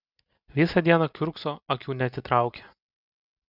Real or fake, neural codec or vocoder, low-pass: real; none; 5.4 kHz